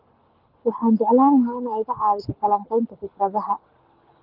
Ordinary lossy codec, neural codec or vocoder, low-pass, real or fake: Opus, 32 kbps; codec, 24 kHz, 6 kbps, HILCodec; 5.4 kHz; fake